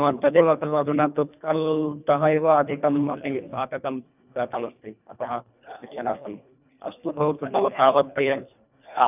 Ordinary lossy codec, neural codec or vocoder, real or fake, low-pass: none; codec, 24 kHz, 1.5 kbps, HILCodec; fake; 3.6 kHz